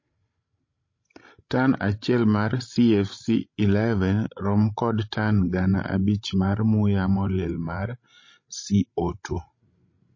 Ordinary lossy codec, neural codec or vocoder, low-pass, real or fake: MP3, 32 kbps; codec, 16 kHz, 16 kbps, FreqCodec, larger model; 7.2 kHz; fake